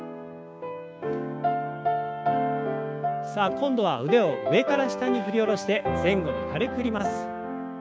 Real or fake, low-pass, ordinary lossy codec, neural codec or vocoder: fake; none; none; codec, 16 kHz, 6 kbps, DAC